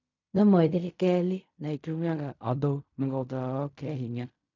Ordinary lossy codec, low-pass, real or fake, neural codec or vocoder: none; 7.2 kHz; fake; codec, 16 kHz in and 24 kHz out, 0.4 kbps, LongCat-Audio-Codec, fine tuned four codebook decoder